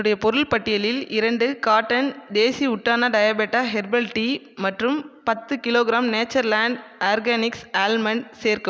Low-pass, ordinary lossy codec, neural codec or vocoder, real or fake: none; none; none; real